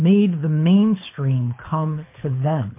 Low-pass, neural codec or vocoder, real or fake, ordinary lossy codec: 3.6 kHz; codec, 24 kHz, 6 kbps, HILCodec; fake; MP3, 24 kbps